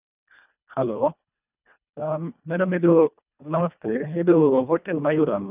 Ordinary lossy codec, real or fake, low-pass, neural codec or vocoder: none; fake; 3.6 kHz; codec, 24 kHz, 1.5 kbps, HILCodec